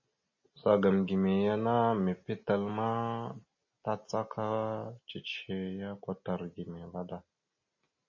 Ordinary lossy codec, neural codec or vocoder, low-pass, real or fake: MP3, 32 kbps; none; 7.2 kHz; real